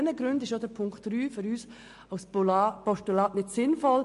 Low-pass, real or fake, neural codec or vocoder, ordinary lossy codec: 14.4 kHz; real; none; MP3, 48 kbps